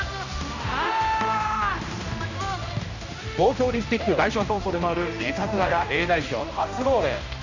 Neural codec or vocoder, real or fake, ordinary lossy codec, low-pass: codec, 16 kHz, 1 kbps, X-Codec, HuBERT features, trained on general audio; fake; none; 7.2 kHz